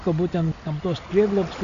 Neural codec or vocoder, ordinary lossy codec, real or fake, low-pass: none; Opus, 64 kbps; real; 7.2 kHz